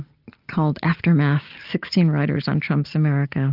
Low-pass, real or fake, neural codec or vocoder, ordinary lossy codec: 5.4 kHz; real; none; Opus, 32 kbps